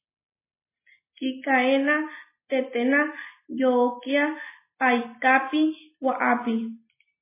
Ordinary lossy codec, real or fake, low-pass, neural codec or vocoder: MP3, 16 kbps; real; 3.6 kHz; none